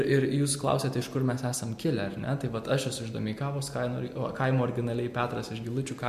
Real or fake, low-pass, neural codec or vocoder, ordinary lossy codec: real; 14.4 kHz; none; MP3, 64 kbps